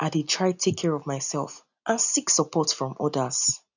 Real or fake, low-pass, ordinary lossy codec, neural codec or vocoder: real; 7.2 kHz; none; none